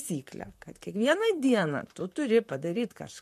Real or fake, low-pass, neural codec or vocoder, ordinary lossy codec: fake; 14.4 kHz; vocoder, 44.1 kHz, 128 mel bands, Pupu-Vocoder; MP3, 64 kbps